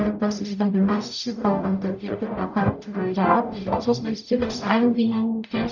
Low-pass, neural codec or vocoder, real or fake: 7.2 kHz; codec, 44.1 kHz, 0.9 kbps, DAC; fake